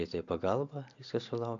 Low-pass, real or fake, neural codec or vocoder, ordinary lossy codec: 7.2 kHz; real; none; AAC, 64 kbps